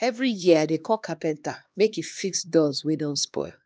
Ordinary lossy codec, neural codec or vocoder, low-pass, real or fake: none; codec, 16 kHz, 2 kbps, X-Codec, HuBERT features, trained on LibriSpeech; none; fake